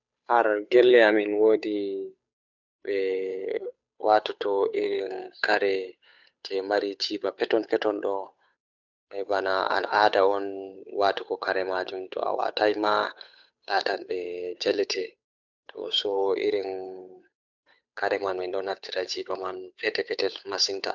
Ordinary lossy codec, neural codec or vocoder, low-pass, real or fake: none; codec, 16 kHz, 8 kbps, FunCodec, trained on Chinese and English, 25 frames a second; 7.2 kHz; fake